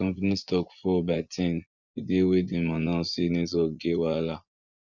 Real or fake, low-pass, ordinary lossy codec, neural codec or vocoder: fake; 7.2 kHz; Opus, 64 kbps; vocoder, 24 kHz, 100 mel bands, Vocos